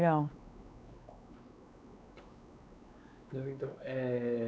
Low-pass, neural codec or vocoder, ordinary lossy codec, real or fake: none; codec, 16 kHz, 4 kbps, X-Codec, WavLM features, trained on Multilingual LibriSpeech; none; fake